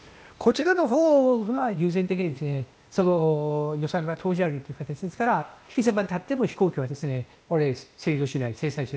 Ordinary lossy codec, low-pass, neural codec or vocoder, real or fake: none; none; codec, 16 kHz, 0.8 kbps, ZipCodec; fake